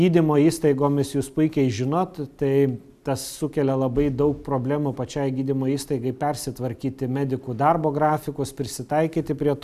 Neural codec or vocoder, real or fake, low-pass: none; real; 14.4 kHz